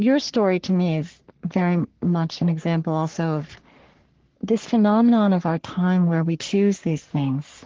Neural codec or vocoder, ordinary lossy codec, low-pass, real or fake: codec, 44.1 kHz, 3.4 kbps, Pupu-Codec; Opus, 16 kbps; 7.2 kHz; fake